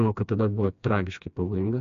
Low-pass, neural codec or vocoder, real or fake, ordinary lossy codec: 7.2 kHz; codec, 16 kHz, 2 kbps, FreqCodec, smaller model; fake; MP3, 64 kbps